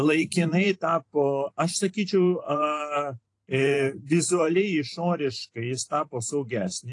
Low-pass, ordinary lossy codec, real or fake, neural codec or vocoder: 10.8 kHz; AAC, 48 kbps; fake; vocoder, 44.1 kHz, 128 mel bands every 512 samples, BigVGAN v2